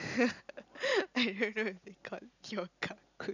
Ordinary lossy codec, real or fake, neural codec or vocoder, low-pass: none; real; none; 7.2 kHz